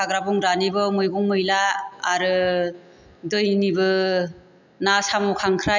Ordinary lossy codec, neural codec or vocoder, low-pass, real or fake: none; none; 7.2 kHz; real